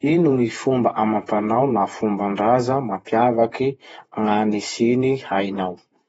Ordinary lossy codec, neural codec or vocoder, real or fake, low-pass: AAC, 24 kbps; vocoder, 48 kHz, 128 mel bands, Vocos; fake; 19.8 kHz